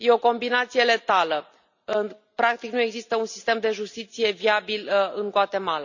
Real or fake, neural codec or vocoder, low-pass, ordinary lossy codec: real; none; 7.2 kHz; none